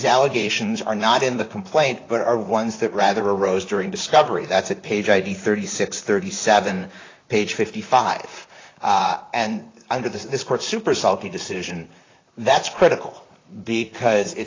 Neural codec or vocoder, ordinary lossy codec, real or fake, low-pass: vocoder, 44.1 kHz, 128 mel bands, Pupu-Vocoder; AAC, 32 kbps; fake; 7.2 kHz